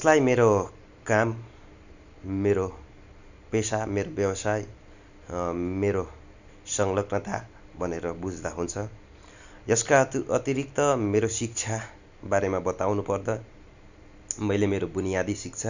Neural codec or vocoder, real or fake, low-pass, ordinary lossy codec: none; real; 7.2 kHz; none